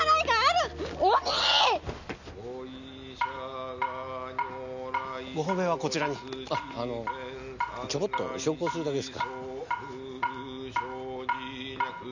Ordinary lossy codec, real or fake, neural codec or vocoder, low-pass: none; real; none; 7.2 kHz